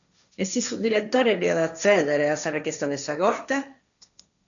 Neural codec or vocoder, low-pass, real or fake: codec, 16 kHz, 1.1 kbps, Voila-Tokenizer; 7.2 kHz; fake